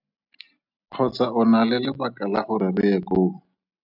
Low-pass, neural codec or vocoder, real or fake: 5.4 kHz; none; real